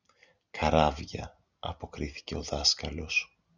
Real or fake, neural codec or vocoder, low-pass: real; none; 7.2 kHz